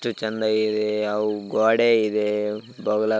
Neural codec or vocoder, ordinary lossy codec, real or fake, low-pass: none; none; real; none